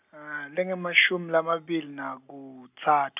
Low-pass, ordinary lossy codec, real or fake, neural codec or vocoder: 3.6 kHz; none; real; none